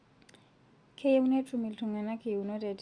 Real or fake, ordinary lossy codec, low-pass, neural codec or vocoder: real; Opus, 64 kbps; 9.9 kHz; none